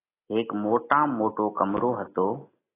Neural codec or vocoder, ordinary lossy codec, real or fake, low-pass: none; AAC, 16 kbps; real; 3.6 kHz